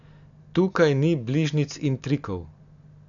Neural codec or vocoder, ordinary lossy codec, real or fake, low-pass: none; none; real; 7.2 kHz